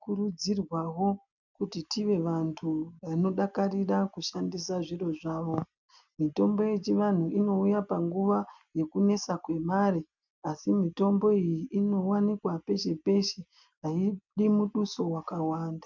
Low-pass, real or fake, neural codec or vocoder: 7.2 kHz; real; none